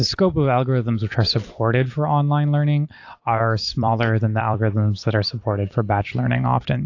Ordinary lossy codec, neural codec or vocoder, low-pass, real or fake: AAC, 48 kbps; vocoder, 44.1 kHz, 128 mel bands every 256 samples, BigVGAN v2; 7.2 kHz; fake